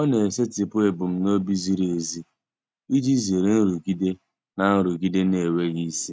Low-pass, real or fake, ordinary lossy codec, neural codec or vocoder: none; real; none; none